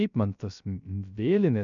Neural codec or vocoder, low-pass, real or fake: codec, 16 kHz, 0.3 kbps, FocalCodec; 7.2 kHz; fake